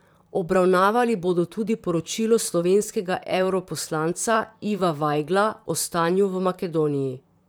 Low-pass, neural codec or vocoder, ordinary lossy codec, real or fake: none; vocoder, 44.1 kHz, 128 mel bands, Pupu-Vocoder; none; fake